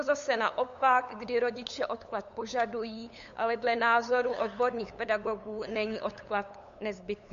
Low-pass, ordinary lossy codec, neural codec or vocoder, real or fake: 7.2 kHz; MP3, 48 kbps; codec, 16 kHz, 8 kbps, FunCodec, trained on LibriTTS, 25 frames a second; fake